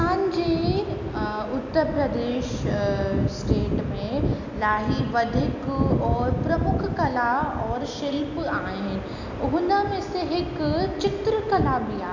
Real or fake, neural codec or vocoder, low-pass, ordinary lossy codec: real; none; 7.2 kHz; none